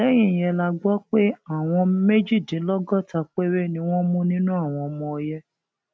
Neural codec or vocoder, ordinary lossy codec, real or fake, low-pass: none; none; real; none